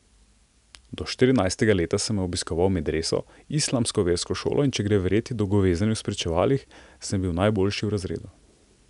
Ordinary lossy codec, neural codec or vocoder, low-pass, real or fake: none; none; 10.8 kHz; real